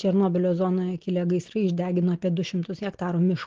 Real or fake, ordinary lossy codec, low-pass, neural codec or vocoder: real; Opus, 16 kbps; 7.2 kHz; none